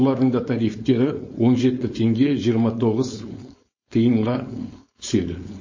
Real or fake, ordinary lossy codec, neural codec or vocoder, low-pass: fake; MP3, 32 kbps; codec, 16 kHz, 4.8 kbps, FACodec; 7.2 kHz